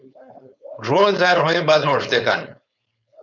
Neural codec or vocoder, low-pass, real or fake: codec, 16 kHz, 4.8 kbps, FACodec; 7.2 kHz; fake